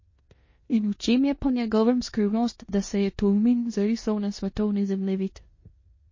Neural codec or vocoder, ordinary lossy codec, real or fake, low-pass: codec, 16 kHz in and 24 kHz out, 0.9 kbps, LongCat-Audio-Codec, four codebook decoder; MP3, 32 kbps; fake; 7.2 kHz